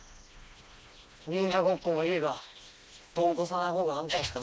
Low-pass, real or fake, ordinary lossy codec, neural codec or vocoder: none; fake; none; codec, 16 kHz, 1 kbps, FreqCodec, smaller model